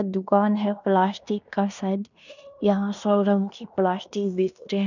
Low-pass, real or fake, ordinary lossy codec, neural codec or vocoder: 7.2 kHz; fake; none; codec, 16 kHz in and 24 kHz out, 0.9 kbps, LongCat-Audio-Codec, fine tuned four codebook decoder